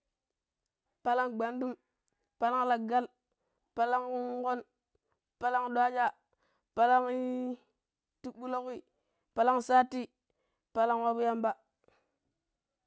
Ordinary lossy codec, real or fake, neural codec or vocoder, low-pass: none; real; none; none